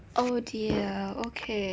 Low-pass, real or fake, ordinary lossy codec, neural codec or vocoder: none; real; none; none